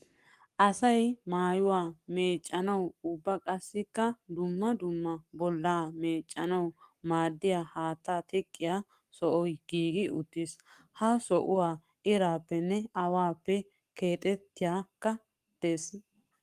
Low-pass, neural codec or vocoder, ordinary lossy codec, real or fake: 14.4 kHz; codec, 44.1 kHz, 7.8 kbps, Pupu-Codec; Opus, 32 kbps; fake